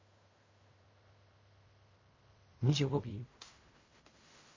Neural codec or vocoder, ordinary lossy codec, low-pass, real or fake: codec, 16 kHz in and 24 kHz out, 0.4 kbps, LongCat-Audio-Codec, fine tuned four codebook decoder; MP3, 32 kbps; 7.2 kHz; fake